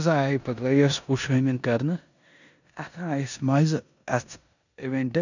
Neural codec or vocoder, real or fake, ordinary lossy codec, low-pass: codec, 16 kHz in and 24 kHz out, 0.9 kbps, LongCat-Audio-Codec, four codebook decoder; fake; none; 7.2 kHz